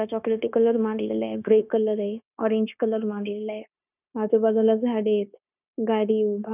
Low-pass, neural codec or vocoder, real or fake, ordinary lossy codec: 3.6 kHz; codec, 16 kHz, 0.9 kbps, LongCat-Audio-Codec; fake; none